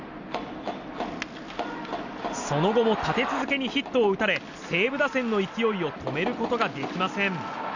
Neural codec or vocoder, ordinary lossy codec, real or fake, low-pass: none; none; real; 7.2 kHz